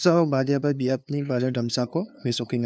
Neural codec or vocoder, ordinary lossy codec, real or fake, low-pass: codec, 16 kHz, 2 kbps, FunCodec, trained on LibriTTS, 25 frames a second; none; fake; none